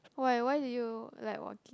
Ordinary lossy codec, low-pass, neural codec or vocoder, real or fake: none; none; none; real